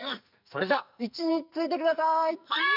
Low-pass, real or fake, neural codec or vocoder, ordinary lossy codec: 5.4 kHz; fake; codec, 44.1 kHz, 2.6 kbps, SNAC; none